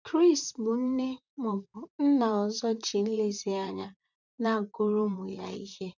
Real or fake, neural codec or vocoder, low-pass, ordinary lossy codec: fake; vocoder, 44.1 kHz, 128 mel bands, Pupu-Vocoder; 7.2 kHz; none